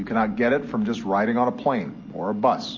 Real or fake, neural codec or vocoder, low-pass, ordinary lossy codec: real; none; 7.2 kHz; MP3, 32 kbps